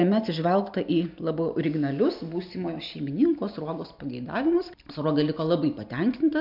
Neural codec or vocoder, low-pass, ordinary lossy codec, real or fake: none; 5.4 kHz; MP3, 48 kbps; real